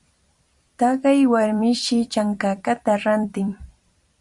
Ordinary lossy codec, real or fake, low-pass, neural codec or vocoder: Opus, 64 kbps; fake; 10.8 kHz; vocoder, 44.1 kHz, 128 mel bands every 256 samples, BigVGAN v2